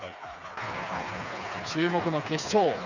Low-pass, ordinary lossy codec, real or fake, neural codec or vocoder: 7.2 kHz; none; fake; codec, 16 kHz, 4 kbps, FreqCodec, smaller model